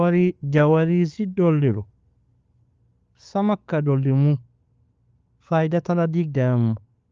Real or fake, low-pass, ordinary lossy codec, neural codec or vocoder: real; 7.2 kHz; Opus, 24 kbps; none